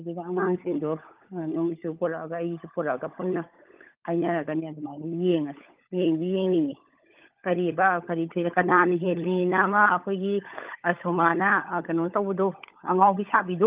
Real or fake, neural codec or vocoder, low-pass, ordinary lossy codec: fake; codec, 16 kHz, 16 kbps, FunCodec, trained on LibriTTS, 50 frames a second; 3.6 kHz; Opus, 32 kbps